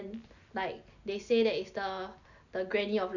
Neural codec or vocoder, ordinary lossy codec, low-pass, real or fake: none; none; 7.2 kHz; real